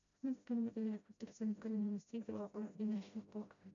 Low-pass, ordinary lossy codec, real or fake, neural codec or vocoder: 7.2 kHz; AAC, 48 kbps; fake; codec, 16 kHz, 0.5 kbps, FreqCodec, smaller model